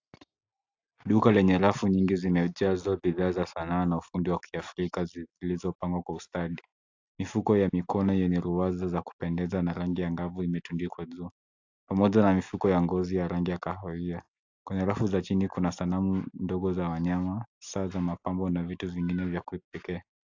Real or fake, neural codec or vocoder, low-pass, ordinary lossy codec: real; none; 7.2 kHz; AAC, 48 kbps